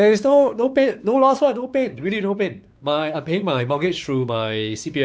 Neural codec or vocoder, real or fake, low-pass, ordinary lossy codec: codec, 16 kHz, 4 kbps, X-Codec, WavLM features, trained on Multilingual LibriSpeech; fake; none; none